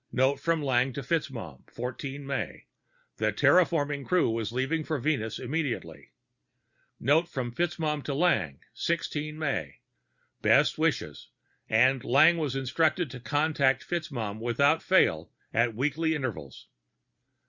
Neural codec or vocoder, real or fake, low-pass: none; real; 7.2 kHz